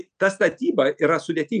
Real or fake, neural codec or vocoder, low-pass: real; none; 9.9 kHz